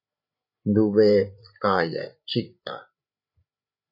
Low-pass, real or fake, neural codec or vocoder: 5.4 kHz; fake; codec, 16 kHz, 8 kbps, FreqCodec, larger model